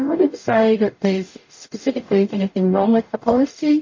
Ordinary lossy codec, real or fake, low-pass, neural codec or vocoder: MP3, 32 kbps; fake; 7.2 kHz; codec, 44.1 kHz, 0.9 kbps, DAC